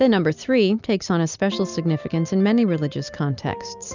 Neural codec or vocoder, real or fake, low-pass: none; real; 7.2 kHz